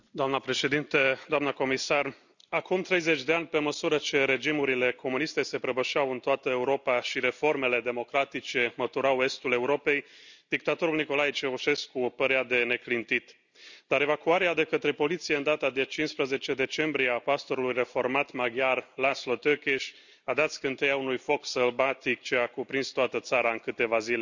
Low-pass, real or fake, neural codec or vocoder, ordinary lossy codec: 7.2 kHz; real; none; none